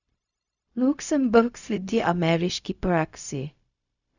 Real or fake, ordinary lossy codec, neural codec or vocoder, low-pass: fake; none; codec, 16 kHz, 0.4 kbps, LongCat-Audio-Codec; 7.2 kHz